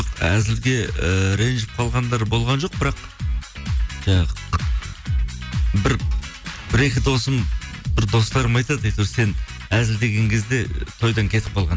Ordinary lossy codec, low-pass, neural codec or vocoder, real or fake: none; none; none; real